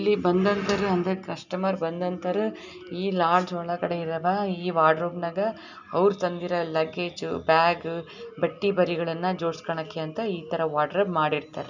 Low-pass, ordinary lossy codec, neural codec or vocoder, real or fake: 7.2 kHz; none; none; real